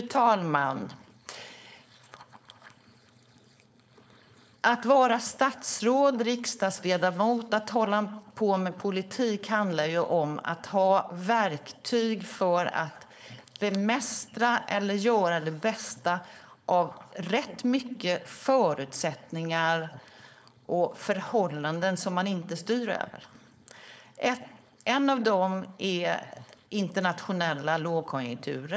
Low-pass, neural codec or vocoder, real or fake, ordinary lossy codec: none; codec, 16 kHz, 4.8 kbps, FACodec; fake; none